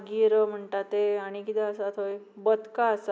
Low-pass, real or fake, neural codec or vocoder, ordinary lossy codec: none; real; none; none